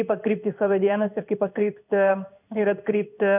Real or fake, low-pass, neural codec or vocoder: fake; 3.6 kHz; codec, 16 kHz in and 24 kHz out, 1 kbps, XY-Tokenizer